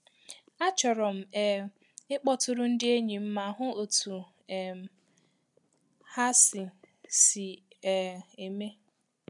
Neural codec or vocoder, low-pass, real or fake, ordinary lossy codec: none; 10.8 kHz; real; none